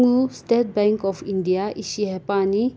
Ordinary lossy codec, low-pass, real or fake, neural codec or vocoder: none; none; real; none